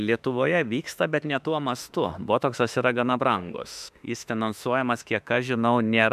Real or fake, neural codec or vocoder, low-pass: fake; autoencoder, 48 kHz, 32 numbers a frame, DAC-VAE, trained on Japanese speech; 14.4 kHz